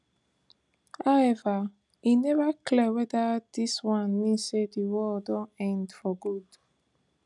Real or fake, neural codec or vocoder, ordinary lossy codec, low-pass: real; none; none; 10.8 kHz